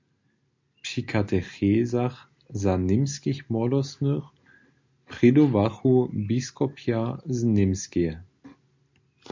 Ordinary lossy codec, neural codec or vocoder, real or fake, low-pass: MP3, 64 kbps; none; real; 7.2 kHz